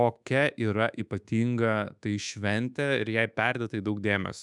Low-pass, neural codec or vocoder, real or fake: 10.8 kHz; codec, 24 kHz, 1.2 kbps, DualCodec; fake